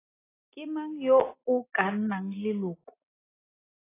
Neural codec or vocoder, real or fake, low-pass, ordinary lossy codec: none; real; 3.6 kHz; AAC, 16 kbps